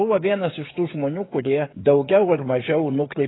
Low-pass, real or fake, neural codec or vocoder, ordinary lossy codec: 7.2 kHz; fake; codec, 16 kHz, 16 kbps, FreqCodec, smaller model; AAC, 16 kbps